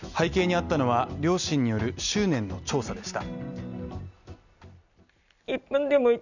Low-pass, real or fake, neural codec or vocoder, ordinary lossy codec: 7.2 kHz; real; none; none